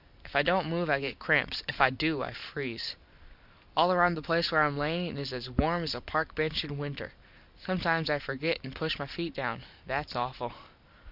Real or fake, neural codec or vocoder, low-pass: real; none; 5.4 kHz